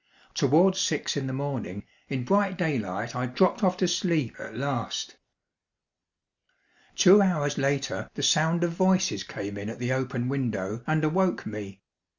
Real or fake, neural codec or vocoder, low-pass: real; none; 7.2 kHz